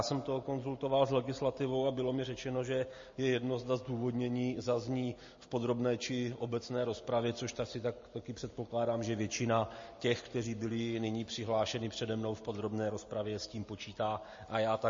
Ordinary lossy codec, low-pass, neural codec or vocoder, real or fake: MP3, 32 kbps; 7.2 kHz; none; real